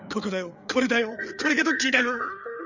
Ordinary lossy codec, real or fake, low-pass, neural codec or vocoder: none; fake; 7.2 kHz; codec, 16 kHz, 4 kbps, FreqCodec, larger model